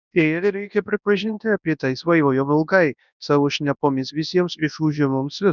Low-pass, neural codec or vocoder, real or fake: 7.2 kHz; codec, 24 kHz, 0.9 kbps, WavTokenizer, large speech release; fake